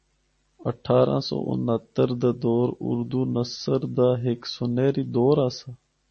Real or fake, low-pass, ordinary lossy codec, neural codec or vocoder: real; 10.8 kHz; MP3, 32 kbps; none